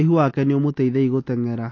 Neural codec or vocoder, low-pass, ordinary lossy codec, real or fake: none; 7.2 kHz; AAC, 32 kbps; real